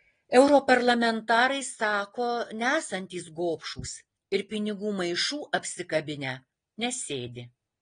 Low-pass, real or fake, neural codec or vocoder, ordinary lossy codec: 9.9 kHz; real; none; AAC, 32 kbps